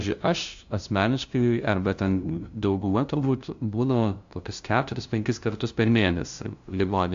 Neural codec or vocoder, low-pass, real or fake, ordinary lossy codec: codec, 16 kHz, 0.5 kbps, FunCodec, trained on LibriTTS, 25 frames a second; 7.2 kHz; fake; AAC, 64 kbps